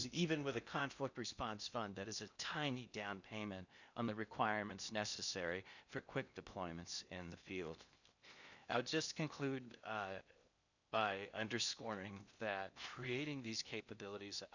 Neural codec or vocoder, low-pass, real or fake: codec, 16 kHz in and 24 kHz out, 0.8 kbps, FocalCodec, streaming, 65536 codes; 7.2 kHz; fake